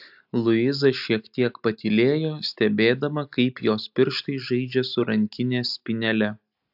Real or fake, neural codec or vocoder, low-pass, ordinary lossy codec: real; none; 5.4 kHz; AAC, 48 kbps